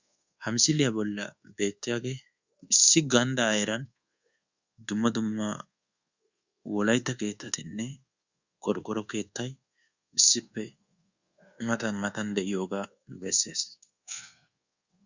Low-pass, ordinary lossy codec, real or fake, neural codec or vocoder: 7.2 kHz; Opus, 64 kbps; fake; codec, 24 kHz, 1.2 kbps, DualCodec